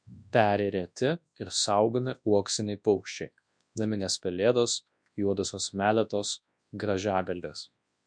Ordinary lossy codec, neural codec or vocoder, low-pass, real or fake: MP3, 64 kbps; codec, 24 kHz, 0.9 kbps, WavTokenizer, large speech release; 9.9 kHz; fake